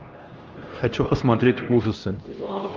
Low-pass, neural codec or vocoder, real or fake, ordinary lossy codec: 7.2 kHz; codec, 16 kHz, 1 kbps, X-Codec, HuBERT features, trained on LibriSpeech; fake; Opus, 24 kbps